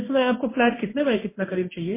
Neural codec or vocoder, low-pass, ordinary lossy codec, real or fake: codec, 16 kHz in and 24 kHz out, 1 kbps, XY-Tokenizer; 3.6 kHz; MP3, 16 kbps; fake